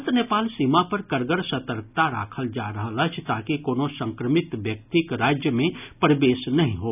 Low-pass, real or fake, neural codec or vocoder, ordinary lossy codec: 3.6 kHz; real; none; none